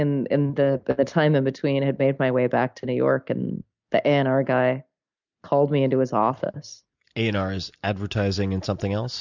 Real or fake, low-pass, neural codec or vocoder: real; 7.2 kHz; none